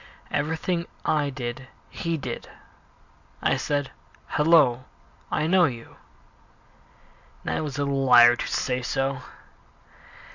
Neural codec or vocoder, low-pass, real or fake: none; 7.2 kHz; real